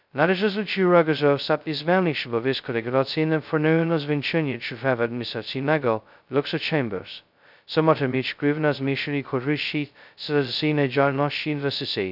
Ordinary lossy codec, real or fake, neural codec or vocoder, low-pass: none; fake; codec, 16 kHz, 0.2 kbps, FocalCodec; 5.4 kHz